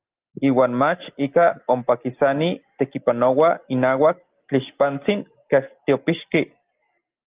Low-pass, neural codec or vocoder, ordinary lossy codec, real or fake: 3.6 kHz; none; Opus, 24 kbps; real